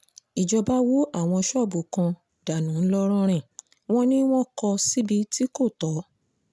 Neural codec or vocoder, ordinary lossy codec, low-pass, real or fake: none; none; none; real